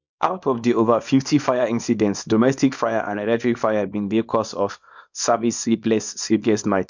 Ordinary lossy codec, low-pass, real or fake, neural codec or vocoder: MP3, 64 kbps; 7.2 kHz; fake; codec, 24 kHz, 0.9 kbps, WavTokenizer, small release